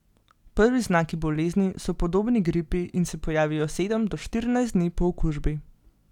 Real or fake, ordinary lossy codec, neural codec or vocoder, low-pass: real; none; none; 19.8 kHz